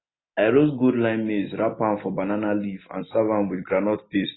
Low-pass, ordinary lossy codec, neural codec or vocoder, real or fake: 7.2 kHz; AAC, 16 kbps; none; real